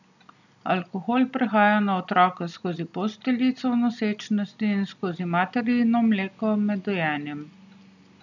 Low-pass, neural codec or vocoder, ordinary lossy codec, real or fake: 7.2 kHz; none; none; real